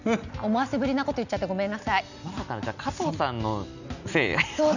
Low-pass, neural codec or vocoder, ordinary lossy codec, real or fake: 7.2 kHz; none; none; real